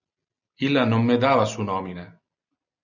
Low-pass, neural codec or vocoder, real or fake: 7.2 kHz; none; real